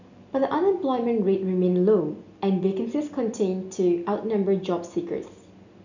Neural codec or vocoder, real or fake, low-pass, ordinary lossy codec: none; real; 7.2 kHz; none